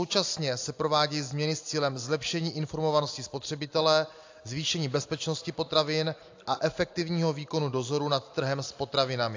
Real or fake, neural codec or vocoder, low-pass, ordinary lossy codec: real; none; 7.2 kHz; AAC, 48 kbps